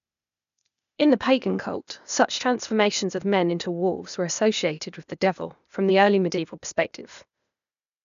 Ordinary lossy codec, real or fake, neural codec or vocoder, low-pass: none; fake; codec, 16 kHz, 0.8 kbps, ZipCodec; 7.2 kHz